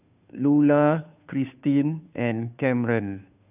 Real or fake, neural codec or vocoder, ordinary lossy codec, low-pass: fake; codec, 16 kHz, 2 kbps, FunCodec, trained on Chinese and English, 25 frames a second; none; 3.6 kHz